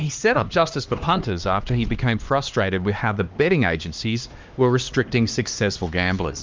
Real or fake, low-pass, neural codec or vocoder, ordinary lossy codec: fake; 7.2 kHz; codec, 16 kHz, 2 kbps, X-Codec, HuBERT features, trained on LibriSpeech; Opus, 24 kbps